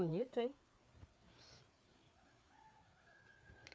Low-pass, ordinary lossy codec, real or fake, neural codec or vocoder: none; none; fake; codec, 16 kHz, 4 kbps, FreqCodec, larger model